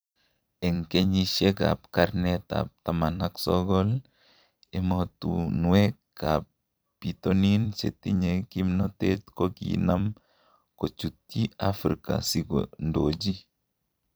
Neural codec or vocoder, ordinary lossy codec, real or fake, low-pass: vocoder, 44.1 kHz, 128 mel bands every 256 samples, BigVGAN v2; none; fake; none